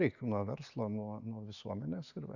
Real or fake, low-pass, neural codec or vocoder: real; 7.2 kHz; none